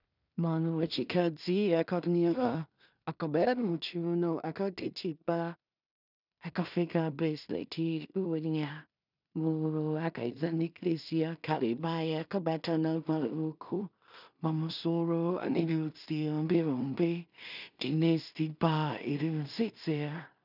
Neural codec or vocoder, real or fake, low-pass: codec, 16 kHz in and 24 kHz out, 0.4 kbps, LongCat-Audio-Codec, two codebook decoder; fake; 5.4 kHz